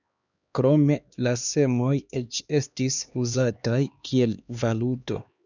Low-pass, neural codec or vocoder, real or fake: 7.2 kHz; codec, 16 kHz, 2 kbps, X-Codec, HuBERT features, trained on LibriSpeech; fake